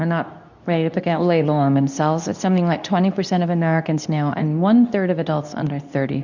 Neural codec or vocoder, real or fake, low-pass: codec, 24 kHz, 0.9 kbps, WavTokenizer, medium speech release version 2; fake; 7.2 kHz